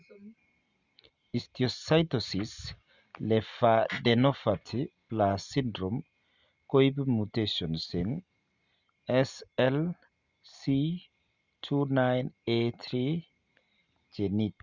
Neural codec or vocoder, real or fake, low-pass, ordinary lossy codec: none; real; 7.2 kHz; Opus, 64 kbps